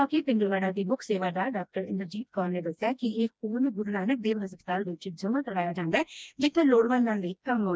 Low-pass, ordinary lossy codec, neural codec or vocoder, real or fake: none; none; codec, 16 kHz, 1 kbps, FreqCodec, smaller model; fake